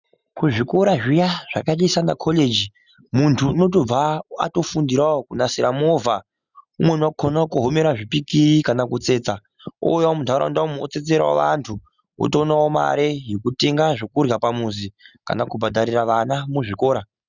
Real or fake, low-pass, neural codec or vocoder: real; 7.2 kHz; none